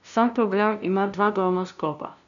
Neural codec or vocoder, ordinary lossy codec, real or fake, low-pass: codec, 16 kHz, 0.5 kbps, FunCodec, trained on LibriTTS, 25 frames a second; none; fake; 7.2 kHz